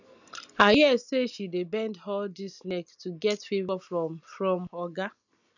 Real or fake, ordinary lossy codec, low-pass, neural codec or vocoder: real; none; 7.2 kHz; none